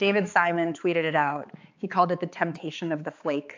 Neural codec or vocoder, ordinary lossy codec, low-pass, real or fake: codec, 16 kHz, 4 kbps, X-Codec, HuBERT features, trained on balanced general audio; AAC, 48 kbps; 7.2 kHz; fake